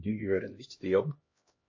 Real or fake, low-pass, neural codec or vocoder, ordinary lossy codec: fake; 7.2 kHz; codec, 16 kHz, 1 kbps, X-Codec, HuBERT features, trained on LibriSpeech; MP3, 32 kbps